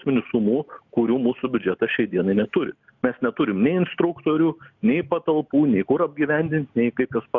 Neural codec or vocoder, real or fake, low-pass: none; real; 7.2 kHz